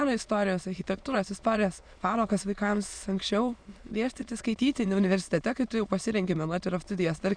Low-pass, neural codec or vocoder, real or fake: 9.9 kHz; autoencoder, 22.05 kHz, a latent of 192 numbers a frame, VITS, trained on many speakers; fake